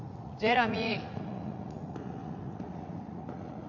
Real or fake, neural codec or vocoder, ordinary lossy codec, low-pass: fake; vocoder, 44.1 kHz, 80 mel bands, Vocos; none; 7.2 kHz